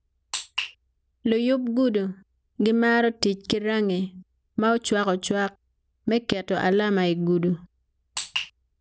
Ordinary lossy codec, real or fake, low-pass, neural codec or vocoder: none; real; none; none